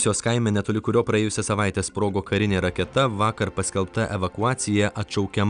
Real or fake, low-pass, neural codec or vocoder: real; 9.9 kHz; none